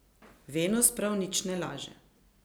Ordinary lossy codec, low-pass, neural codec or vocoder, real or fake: none; none; none; real